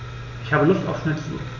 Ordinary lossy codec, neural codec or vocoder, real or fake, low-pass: none; none; real; 7.2 kHz